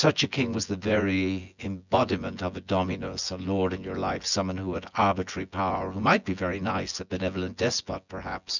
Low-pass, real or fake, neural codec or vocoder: 7.2 kHz; fake; vocoder, 24 kHz, 100 mel bands, Vocos